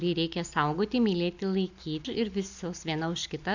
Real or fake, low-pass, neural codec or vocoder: real; 7.2 kHz; none